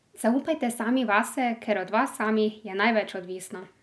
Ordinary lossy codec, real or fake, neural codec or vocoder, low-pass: none; real; none; none